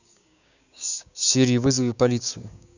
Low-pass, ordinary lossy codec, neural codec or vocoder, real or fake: 7.2 kHz; none; codec, 16 kHz, 6 kbps, DAC; fake